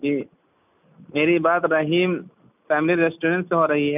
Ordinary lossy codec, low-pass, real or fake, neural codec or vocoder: none; 3.6 kHz; real; none